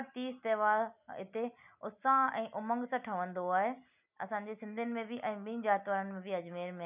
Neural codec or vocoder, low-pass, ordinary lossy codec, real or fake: none; 3.6 kHz; none; real